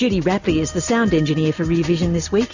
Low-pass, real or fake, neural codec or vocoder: 7.2 kHz; real; none